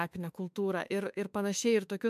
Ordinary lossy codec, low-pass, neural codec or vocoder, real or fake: MP3, 96 kbps; 14.4 kHz; autoencoder, 48 kHz, 32 numbers a frame, DAC-VAE, trained on Japanese speech; fake